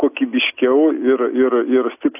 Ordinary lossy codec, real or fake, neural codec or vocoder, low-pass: AAC, 32 kbps; real; none; 3.6 kHz